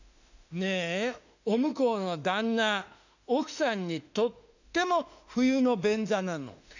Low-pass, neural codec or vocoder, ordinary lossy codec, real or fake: 7.2 kHz; autoencoder, 48 kHz, 32 numbers a frame, DAC-VAE, trained on Japanese speech; none; fake